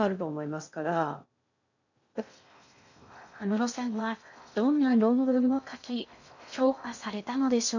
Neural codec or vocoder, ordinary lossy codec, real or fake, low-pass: codec, 16 kHz in and 24 kHz out, 0.6 kbps, FocalCodec, streaming, 2048 codes; none; fake; 7.2 kHz